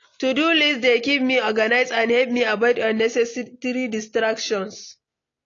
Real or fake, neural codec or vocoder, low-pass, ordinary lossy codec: real; none; 7.2 kHz; AAC, 32 kbps